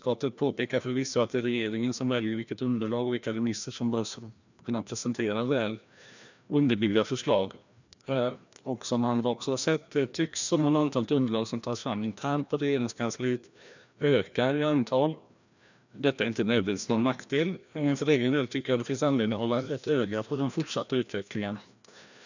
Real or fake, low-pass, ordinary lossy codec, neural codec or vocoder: fake; 7.2 kHz; none; codec, 16 kHz, 1 kbps, FreqCodec, larger model